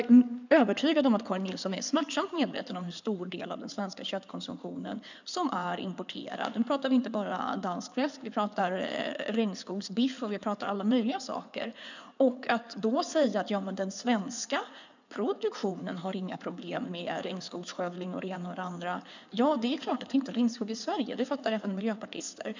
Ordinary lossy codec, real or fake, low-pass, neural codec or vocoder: none; fake; 7.2 kHz; codec, 16 kHz in and 24 kHz out, 2.2 kbps, FireRedTTS-2 codec